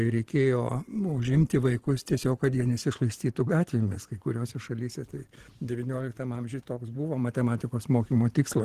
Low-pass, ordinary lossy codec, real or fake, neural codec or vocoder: 14.4 kHz; Opus, 16 kbps; fake; vocoder, 44.1 kHz, 128 mel bands every 512 samples, BigVGAN v2